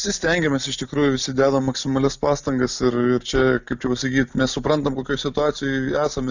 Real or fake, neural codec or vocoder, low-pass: real; none; 7.2 kHz